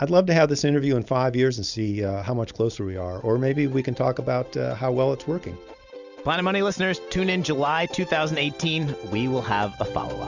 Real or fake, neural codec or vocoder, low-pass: real; none; 7.2 kHz